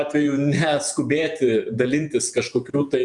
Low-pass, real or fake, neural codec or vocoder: 10.8 kHz; fake; vocoder, 48 kHz, 128 mel bands, Vocos